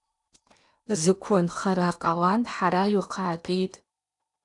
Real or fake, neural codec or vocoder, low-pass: fake; codec, 16 kHz in and 24 kHz out, 0.8 kbps, FocalCodec, streaming, 65536 codes; 10.8 kHz